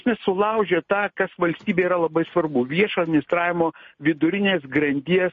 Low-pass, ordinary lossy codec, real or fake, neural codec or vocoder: 7.2 kHz; MP3, 32 kbps; real; none